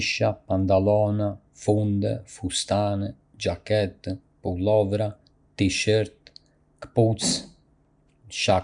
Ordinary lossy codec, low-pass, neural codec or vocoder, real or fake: none; 9.9 kHz; none; real